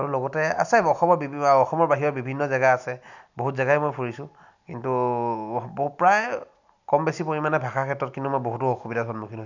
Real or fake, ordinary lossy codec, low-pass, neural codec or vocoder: real; none; 7.2 kHz; none